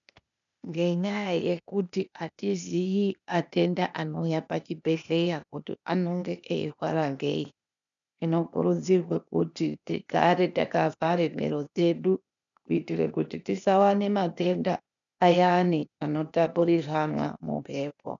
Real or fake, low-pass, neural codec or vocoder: fake; 7.2 kHz; codec, 16 kHz, 0.8 kbps, ZipCodec